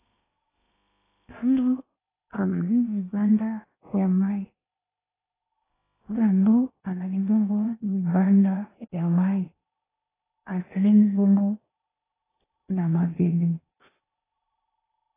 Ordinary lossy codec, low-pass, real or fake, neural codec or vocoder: AAC, 16 kbps; 3.6 kHz; fake; codec, 16 kHz in and 24 kHz out, 0.8 kbps, FocalCodec, streaming, 65536 codes